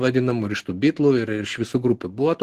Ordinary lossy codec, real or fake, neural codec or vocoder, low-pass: Opus, 16 kbps; real; none; 14.4 kHz